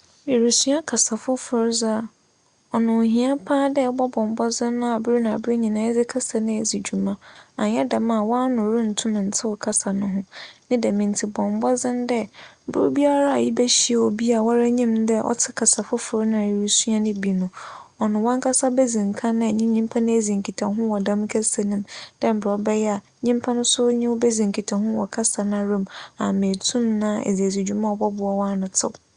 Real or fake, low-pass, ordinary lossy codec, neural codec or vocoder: real; 9.9 kHz; Opus, 64 kbps; none